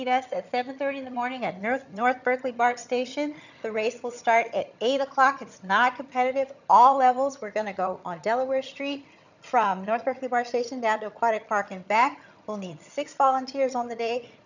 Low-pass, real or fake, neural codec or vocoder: 7.2 kHz; fake; vocoder, 22.05 kHz, 80 mel bands, HiFi-GAN